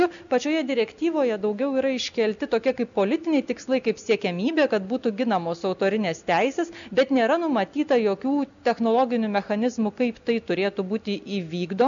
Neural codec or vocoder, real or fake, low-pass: none; real; 7.2 kHz